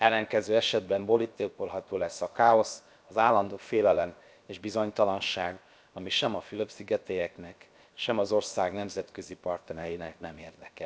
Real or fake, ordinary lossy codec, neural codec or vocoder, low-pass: fake; none; codec, 16 kHz, 0.7 kbps, FocalCodec; none